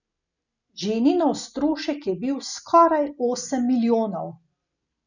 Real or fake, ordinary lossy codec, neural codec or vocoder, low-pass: real; none; none; 7.2 kHz